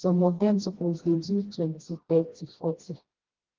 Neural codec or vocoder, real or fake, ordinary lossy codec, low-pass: codec, 16 kHz, 1 kbps, FreqCodec, smaller model; fake; Opus, 16 kbps; 7.2 kHz